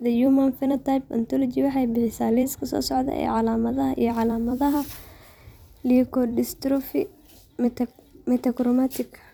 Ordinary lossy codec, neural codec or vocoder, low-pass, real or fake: none; vocoder, 44.1 kHz, 128 mel bands every 256 samples, BigVGAN v2; none; fake